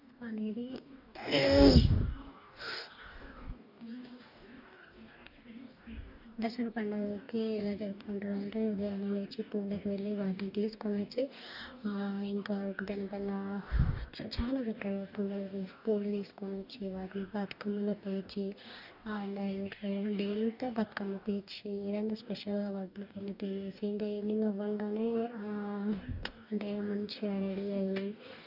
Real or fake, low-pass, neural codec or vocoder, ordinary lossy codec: fake; 5.4 kHz; codec, 44.1 kHz, 2.6 kbps, DAC; none